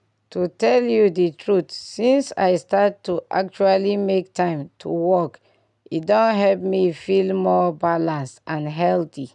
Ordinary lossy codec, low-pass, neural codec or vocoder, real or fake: none; 10.8 kHz; none; real